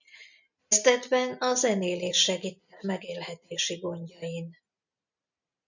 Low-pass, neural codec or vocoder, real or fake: 7.2 kHz; none; real